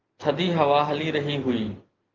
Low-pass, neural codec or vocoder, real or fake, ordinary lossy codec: 7.2 kHz; none; real; Opus, 32 kbps